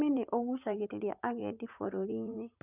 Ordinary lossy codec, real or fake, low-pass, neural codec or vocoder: none; fake; 3.6 kHz; vocoder, 44.1 kHz, 128 mel bands, Pupu-Vocoder